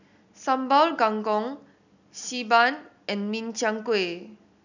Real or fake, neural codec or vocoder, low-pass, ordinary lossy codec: real; none; 7.2 kHz; none